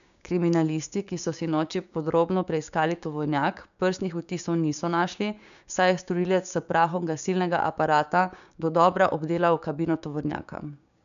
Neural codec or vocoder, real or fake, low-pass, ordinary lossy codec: codec, 16 kHz, 6 kbps, DAC; fake; 7.2 kHz; none